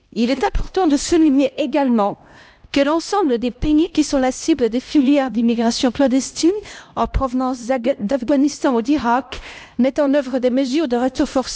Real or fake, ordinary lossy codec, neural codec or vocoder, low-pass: fake; none; codec, 16 kHz, 1 kbps, X-Codec, HuBERT features, trained on LibriSpeech; none